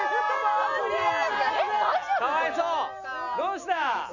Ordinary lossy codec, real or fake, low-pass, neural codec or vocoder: none; real; 7.2 kHz; none